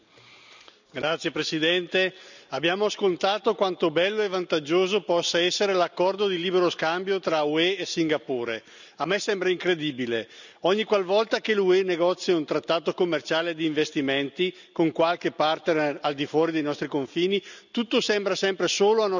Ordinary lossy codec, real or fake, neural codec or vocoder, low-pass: none; real; none; 7.2 kHz